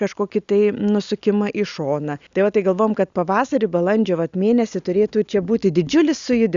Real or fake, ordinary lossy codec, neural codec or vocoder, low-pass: real; Opus, 64 kbps; none; 7.2 kHz